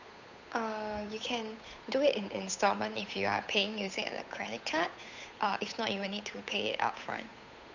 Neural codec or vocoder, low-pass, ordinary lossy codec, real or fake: codec, 16 kHz, 8 kbps, FunCodec, trained on Chinese and English, 25 frames a second; 7.2 kHz; none; fake